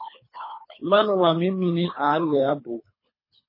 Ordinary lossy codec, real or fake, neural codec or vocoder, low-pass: MP3, 24 kbps; fake; codec, 24 kHz, 3 kbps, HILCodec; 5.4 kHz